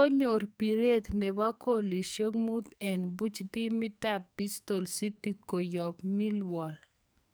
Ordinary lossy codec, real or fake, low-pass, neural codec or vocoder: none; fake; none; codec, 44.1 kHz, 2.6 kbps, SNAC